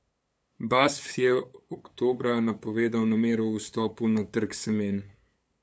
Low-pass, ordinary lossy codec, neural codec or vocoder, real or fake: none; none; codec, 16 kHz, 8 kbps, FunCodec, trained on LibriTTS, 25 frames a second; fake